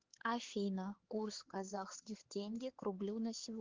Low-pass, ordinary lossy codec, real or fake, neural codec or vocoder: 7.2 kHz; Opus, 16 kbps; fake; codec, 16 kHz, 4 kbps, X-Codec, HuBERT features, trained on LibriSpeech